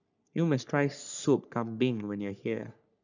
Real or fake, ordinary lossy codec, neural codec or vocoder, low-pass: fake; none; codec, 44.1 kHz, 3.4 kbps, Pupu-Codec; 7.2 kHz